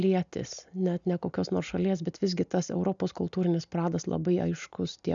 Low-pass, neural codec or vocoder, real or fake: 7.2 kHz; none; real